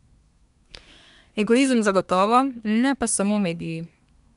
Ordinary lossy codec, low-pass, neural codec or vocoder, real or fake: none; 10.8 kHz; codec, 24 kHz, 1 kbps, SNAC; fake